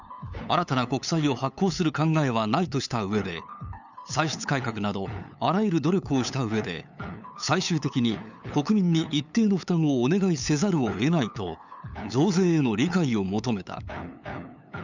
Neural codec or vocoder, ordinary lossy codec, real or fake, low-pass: codec, 16 kHz, 8 kbps, FunCodec, trained on LibriTTS, 25 frames a second; none; fake; 7.2 kHz